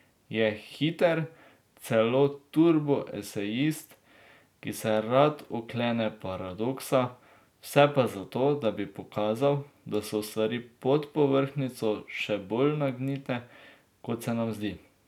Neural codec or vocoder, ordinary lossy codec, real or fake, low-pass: none; none; real; 19.8 kHz